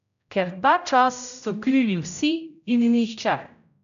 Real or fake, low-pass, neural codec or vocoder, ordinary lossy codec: fake; 7.2 kHz; codec, 16 kHz, 0.5 kbps, X-Codec, HuBERT features, trained on general audio; none